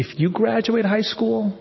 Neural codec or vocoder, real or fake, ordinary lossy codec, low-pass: none; real; MP3, 24 kbps; 7.2 kHz